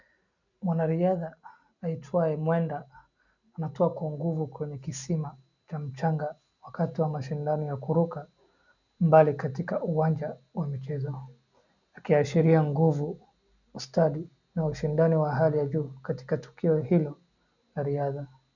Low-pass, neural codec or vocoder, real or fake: 7.2 kHz; none; real